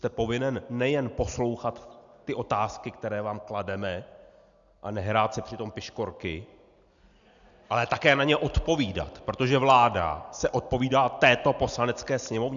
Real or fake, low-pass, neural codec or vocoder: real; 7.2 kHz; none